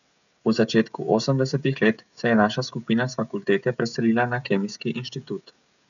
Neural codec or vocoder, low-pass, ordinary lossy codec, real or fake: codec, 16 kHz, 16 kbps, FreqCodec, smaller model; 7.2 kHz; none; fake